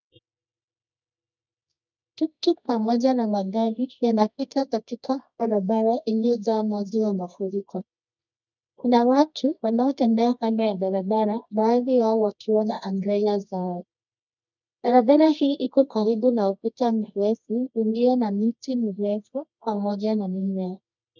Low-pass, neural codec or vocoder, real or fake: 7.2 kHz; codec, 24 kHz, 0.9 kbps, WavTokenizer, medium music audio release; fake